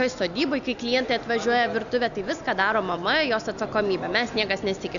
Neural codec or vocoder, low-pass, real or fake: none; 7.2 kHz; real